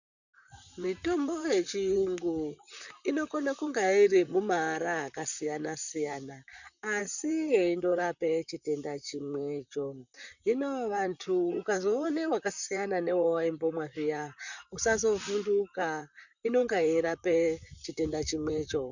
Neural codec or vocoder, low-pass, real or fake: vocoder, 44.1 kHz, 128 mel bands, Pupu-Vocoder; 7.2 kHz; fake